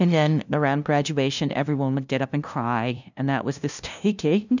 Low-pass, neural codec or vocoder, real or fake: 7.2 kHz; codec, 16 kHz, 0.5 kbps, FunCodec, trained on LibriTTS, 25 frames a second; fake